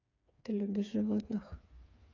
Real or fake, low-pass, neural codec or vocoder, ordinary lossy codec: fake; 7.2 kHz; codec, 16 kHz, 4 kbps, FreqCodec, smaller model; none